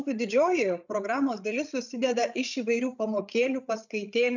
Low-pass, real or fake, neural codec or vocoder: 7.2 kHz; fake; codec, 16 kHz, 16 kbps, FreqCodec, larger model